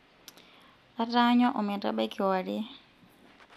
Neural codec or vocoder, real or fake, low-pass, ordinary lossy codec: none; real; 14.4 kHz; none